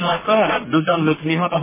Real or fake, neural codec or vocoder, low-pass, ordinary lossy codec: fake; codec, 44.1 kHz, 1.7 kbps, Pupu-Codec; 3.6 kHz; MP3, 16 kbps